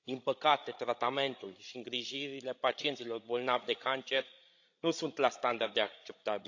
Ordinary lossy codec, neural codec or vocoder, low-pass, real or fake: AAC, 48 kbps; codec, 16 kHz, 16 kbps, FreqCodec, larger model; 7.2 kHz; fake